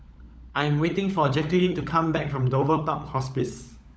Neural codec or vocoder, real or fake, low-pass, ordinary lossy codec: codec, 16 kHz, 16 kbps, FunCodec, trained on LibriTTS, 50 frames a second; fake; none; none